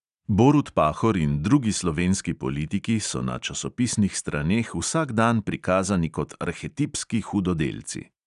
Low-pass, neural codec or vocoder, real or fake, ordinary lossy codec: 10.8 kHz; none; real; none